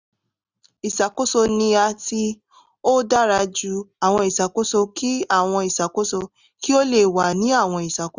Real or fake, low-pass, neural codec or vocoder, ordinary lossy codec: real; 7.2 kHz; none; Opus, 64 kbps